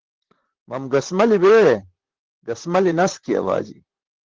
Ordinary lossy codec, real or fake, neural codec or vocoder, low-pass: Opus, 16 kbps; fake; vocoder, 44.1 kHz, 128 mel bands, Pupu-Vocoder; 7.2 kHz